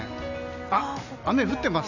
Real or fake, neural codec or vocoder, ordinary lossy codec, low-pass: real; none; none; 7.2 kHz